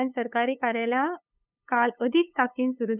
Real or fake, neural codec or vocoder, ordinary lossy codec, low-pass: fake; codec, 16 kHz, 8 kbps, FunCodec, trained on LibriTTS, 25 frames a second; none; 3.6 kHz